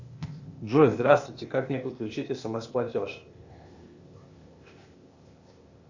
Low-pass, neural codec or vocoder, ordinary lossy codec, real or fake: 7.2 kHz; codec, 16 kHz, 0.8 kbps, ZipCodec; Opus, 64 kbps; fake